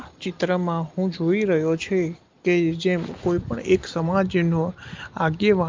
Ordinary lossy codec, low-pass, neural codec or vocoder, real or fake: Opus, 24 kbps; 7.2 kHz; none; real